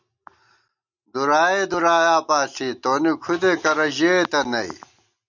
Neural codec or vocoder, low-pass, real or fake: none; 7.2 kHz; real